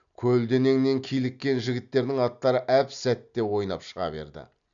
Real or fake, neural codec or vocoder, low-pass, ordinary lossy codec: real; none; 7.2 kHz; none